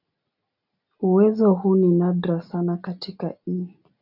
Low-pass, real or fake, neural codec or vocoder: 5.4 kHz; real; none